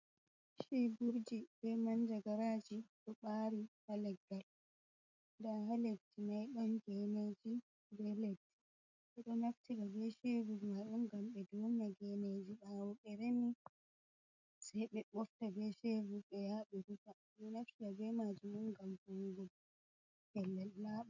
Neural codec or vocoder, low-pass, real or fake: none; 7.2 kHz; real